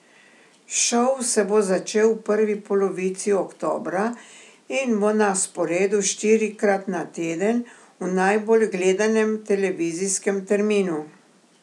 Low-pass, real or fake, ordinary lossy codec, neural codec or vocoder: none; real; none; none